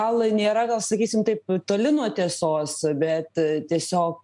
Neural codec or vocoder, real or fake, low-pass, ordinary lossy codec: none; real; 10.8 kHz; MP3, 64 kbps